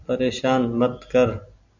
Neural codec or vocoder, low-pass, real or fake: none; 7.2 kHz; real